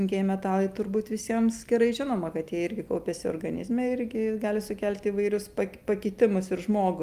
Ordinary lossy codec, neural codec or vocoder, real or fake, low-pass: Opus, 32 kbps; none; real; 14.4 kHz